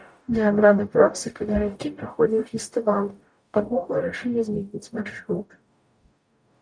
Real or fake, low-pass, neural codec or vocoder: fake; 9.9 kHz; codec, 44.1 kHz, 0.9 kbps, DAC